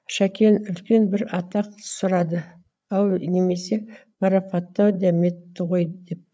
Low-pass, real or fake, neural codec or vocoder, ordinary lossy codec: none; fake; codec, 16 kHz, 8 kbps, FreqCodec, larger model; none